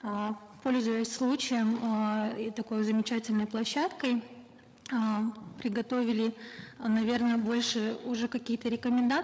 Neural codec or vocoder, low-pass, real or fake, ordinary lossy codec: codec, 16 kHz, 8 kbps, FreqCodec, larger model; none; fake; none